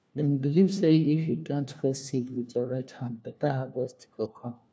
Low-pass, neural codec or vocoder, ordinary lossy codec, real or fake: none; codec, 16 kHz, 1 kbps, FunCodec, trained on LibriTTS, 50 frames a second; none; fake